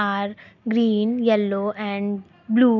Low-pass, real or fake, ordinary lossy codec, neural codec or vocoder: 7.2 kHz; real; none; none